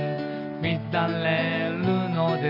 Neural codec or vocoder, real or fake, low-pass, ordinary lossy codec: none; real; 5.4 kHz; none